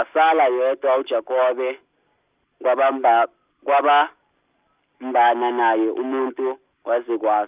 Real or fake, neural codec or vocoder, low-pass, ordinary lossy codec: real; none; 3.6 kHz; Opus, 24 kbps